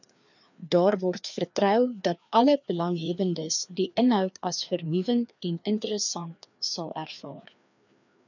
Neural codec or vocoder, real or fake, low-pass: codec, 16 kHz, 2 kbps, FreqCodec, larger model; fake; 7.2 kHz